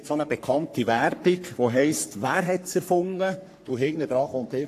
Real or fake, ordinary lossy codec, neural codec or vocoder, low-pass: fake; AAC, 64 kbps; codec, 44.1 kHz, 3.4 kbps, Pupu-Codec; 14.4 kHz